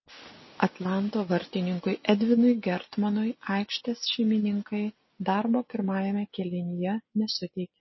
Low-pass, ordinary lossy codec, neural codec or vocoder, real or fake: 7.2 kHz; MP3, 24 kbps; none; real